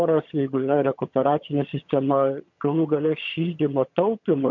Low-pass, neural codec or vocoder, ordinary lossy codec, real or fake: 7.2 kHz; vocoder, 22.05 kHz, 80 mel bands, HiFi-GAN; MP3, 48 kbps; fake